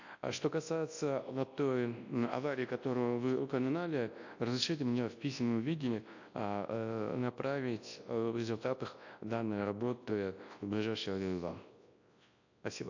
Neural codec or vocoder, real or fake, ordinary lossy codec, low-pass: codec, 24 kHz, 0.9 kbps, WavTokenizer, large speech release; fake; AAC, 48 kbps; 7.2 kHz